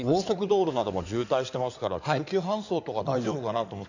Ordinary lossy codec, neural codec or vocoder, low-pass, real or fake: none; codec, 16 kHz in and 24 kHz out, 2.2 kbps, FireRedTTS-2 codec; 7.2 kHz; fake